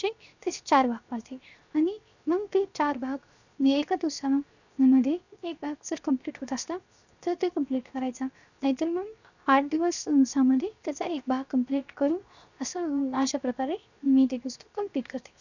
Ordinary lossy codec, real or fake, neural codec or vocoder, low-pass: none; fake; codec, 16 kHz, 0.7 kbps, FocalCodec; 7.2 kHz